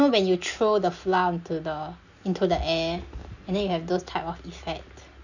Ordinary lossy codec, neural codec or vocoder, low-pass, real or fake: none; none; 7.2 kHz; real